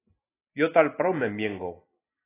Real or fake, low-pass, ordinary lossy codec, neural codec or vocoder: real; 3.6 kHz; AAC, 16 kbps; none